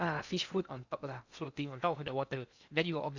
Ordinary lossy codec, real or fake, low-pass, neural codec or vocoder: Opus, 64 kbps; fake; 7.2 kHz; codec, 16 kHz in and 24 kHz out, 0.8 kbps, FocalCodec, streaming, 65536 codes